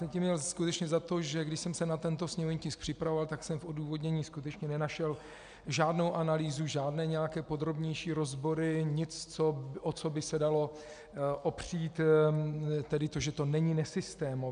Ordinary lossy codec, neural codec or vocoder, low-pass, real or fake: MP3, 96 kbps; none; 9.9 kHz; real